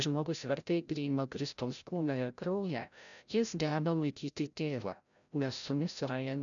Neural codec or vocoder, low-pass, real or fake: codec, 16 kHz, 0.5 kbps, FreqCodec, larger model; 7.2 kHz; fake